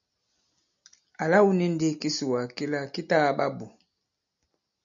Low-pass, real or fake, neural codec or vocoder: 7.2 kHz; real; none